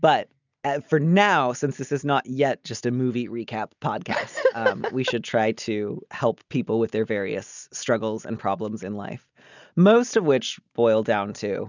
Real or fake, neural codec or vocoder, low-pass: real; none; 7.2 kHz